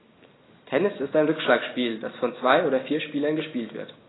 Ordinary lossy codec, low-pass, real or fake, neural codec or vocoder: AAC, 16 kbps; 7.2 kHz; real; none